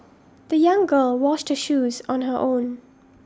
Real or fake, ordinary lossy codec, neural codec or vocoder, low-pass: real; none; none; none